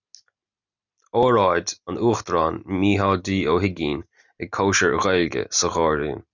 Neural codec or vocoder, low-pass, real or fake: none; 7.2 kHz; real